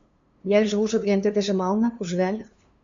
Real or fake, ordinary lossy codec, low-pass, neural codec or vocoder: fake; MP3, 64 kbps; 7.2 kHz; codec, 16 kHz, 2 kbps, FunCodec, trained on LibriTTS, 25 frames a second